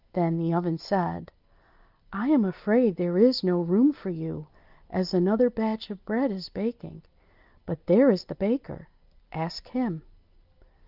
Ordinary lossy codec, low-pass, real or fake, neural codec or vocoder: Opus, 24 kbps; 5.4 kHz; real; none